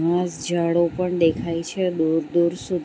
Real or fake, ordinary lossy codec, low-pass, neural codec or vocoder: real; none; none; none